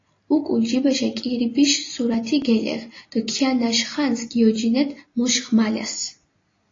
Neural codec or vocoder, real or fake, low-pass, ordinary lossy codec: none; real; 7.2 kHz; AAC, 32 kbps